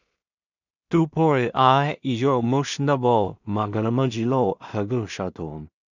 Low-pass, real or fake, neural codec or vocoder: 7.2 kHz; fake; codec, 16 kHz in and 24 kHz out, 0.4 kbps, LongCat-Audio-Codec, two codebook decoder